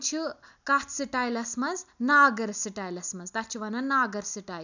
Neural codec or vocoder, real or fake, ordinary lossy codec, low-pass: none; real; none; 7.2 kHz